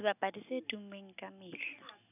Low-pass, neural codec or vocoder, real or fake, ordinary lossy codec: 3.6 kHz; none; real; none